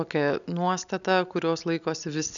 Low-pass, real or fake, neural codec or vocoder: 7.2 kHz; real; none